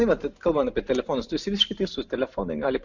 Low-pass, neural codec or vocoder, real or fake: 7.2 kHz; none; real